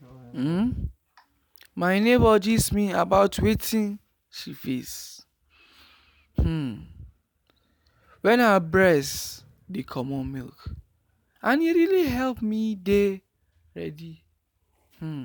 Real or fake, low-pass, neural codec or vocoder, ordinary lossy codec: real; none; none; none